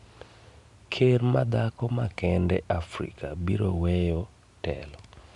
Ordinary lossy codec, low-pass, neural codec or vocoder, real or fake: Opus, 64 kbps; 10.8 kHz; none; real